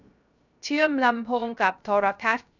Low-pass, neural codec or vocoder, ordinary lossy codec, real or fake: 7.2 kHz; codec, 16 kHz, 0.3 kbps, FocalCodec; none; fake